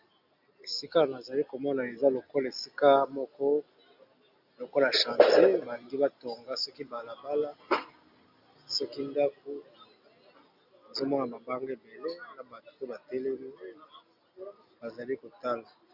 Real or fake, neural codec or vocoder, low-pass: real; none; 5.4 kHz